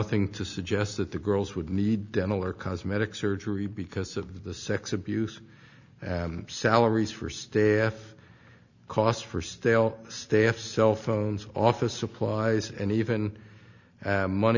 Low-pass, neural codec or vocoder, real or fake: 7.2 kHz; none; real